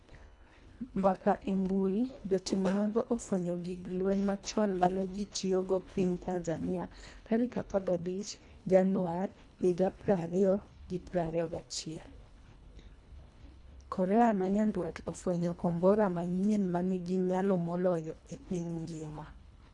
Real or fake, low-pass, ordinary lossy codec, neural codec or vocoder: fake; 10.8 kHz; none; codec, 24 kHz, 1.5 kbps, HILCodec